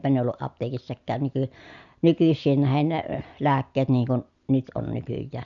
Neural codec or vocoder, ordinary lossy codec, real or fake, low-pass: none; none; real; 7.2 kHz